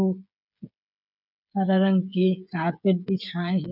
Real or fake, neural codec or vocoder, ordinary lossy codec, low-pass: fake; codec, 16 kHz, 8 kbps, FreqCodec, smaller model; none; 5.4 kHz